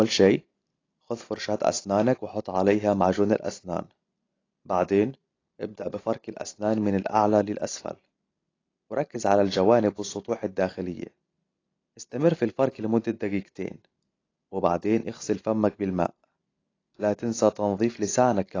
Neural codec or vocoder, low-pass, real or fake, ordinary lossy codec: none; 7.2 kHz; real; AAC, 32 kbps